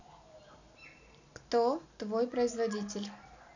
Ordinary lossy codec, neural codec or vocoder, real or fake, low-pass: none; none; real; 7.2 kHz